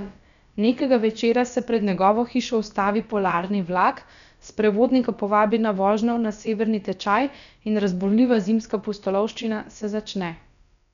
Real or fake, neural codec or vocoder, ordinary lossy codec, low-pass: fake; codec, 16 kHz, about 1 kbps, DyCAST, with the encoder's durations; none; 7.2 kHz